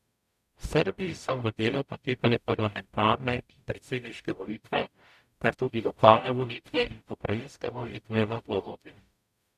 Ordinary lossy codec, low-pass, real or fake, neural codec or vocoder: none; 14.4 kHz; fake; codec, 44.1 kHz, 0.9 kbps, DAC